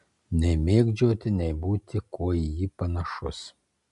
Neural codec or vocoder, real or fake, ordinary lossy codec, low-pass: none; real; MP3, 96 kbps; 10.8 kHz